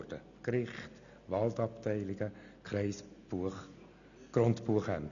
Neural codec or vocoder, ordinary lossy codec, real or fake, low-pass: none; none; real; 7.2 kHz